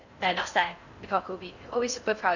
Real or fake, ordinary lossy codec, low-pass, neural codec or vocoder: fake; none; 7.2 kHz; codec, 16 kHz in and 24 kHz out, 0.6 kbps, FocalCodec, streaming, 4096 codes